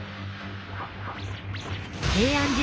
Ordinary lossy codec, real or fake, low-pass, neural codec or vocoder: none; real; none; none